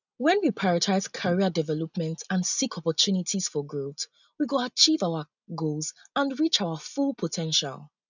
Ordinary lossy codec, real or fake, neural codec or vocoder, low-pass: none; real; none; 7.2 kHz